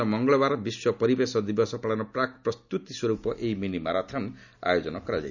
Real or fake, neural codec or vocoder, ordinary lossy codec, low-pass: real; none; none; 7.2 kHz